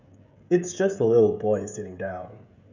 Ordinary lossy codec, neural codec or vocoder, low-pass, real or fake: none; codec, 16 kHz, 16 kbps, FreqCodec, smaller model; 7.2 kHz; fake